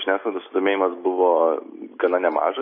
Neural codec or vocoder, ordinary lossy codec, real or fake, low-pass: none; MP3, 24 kbps; real; 5.4 kHz